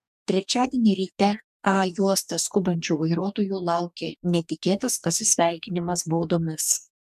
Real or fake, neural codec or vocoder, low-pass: fake; codec, 44.1 kHz, 2.6 kbps, DAC; 14.4 kHz